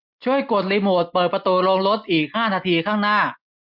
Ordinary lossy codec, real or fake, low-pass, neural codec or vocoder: MP3, 48 kbps; real; 5.4 kHz; none